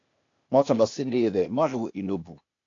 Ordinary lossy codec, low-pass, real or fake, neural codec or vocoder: AAC, 48 kbps; 7.2 kHz; fake; codec, 16 kHz, 0.8 kbps, ZipCodec